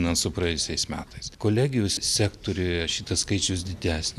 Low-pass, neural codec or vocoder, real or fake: 14.4 kHz; none; real